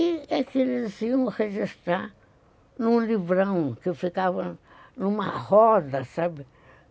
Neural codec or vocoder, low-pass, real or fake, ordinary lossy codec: none; none; real; none